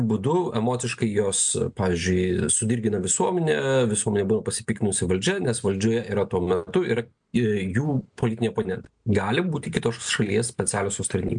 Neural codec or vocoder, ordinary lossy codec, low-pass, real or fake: none; MP3, 64 kbps; 9.9 kHz; real